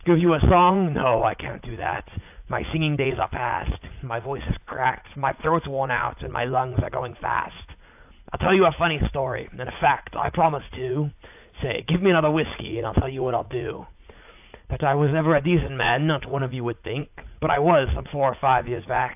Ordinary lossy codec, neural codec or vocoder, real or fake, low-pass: AAC, 32 kbps; vocoder, 44.1 kHz, 80 mel bands, Vocos; fake; 3.6 kHz